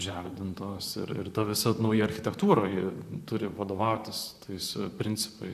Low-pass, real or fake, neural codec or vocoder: 14.4 kHz; fake; vocoder, 44.1 kHz, 128 mel bands, Pupu-Vocoder